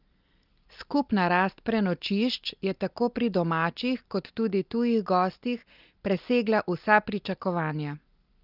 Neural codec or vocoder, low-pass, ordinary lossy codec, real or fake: none; 5.4 kHz; Opus, 32 kbps; real